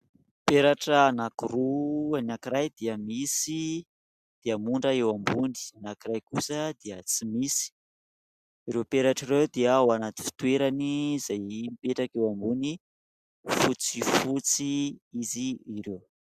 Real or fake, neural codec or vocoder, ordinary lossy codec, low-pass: real; none; Opus, 64 kbps; 14.4 kHz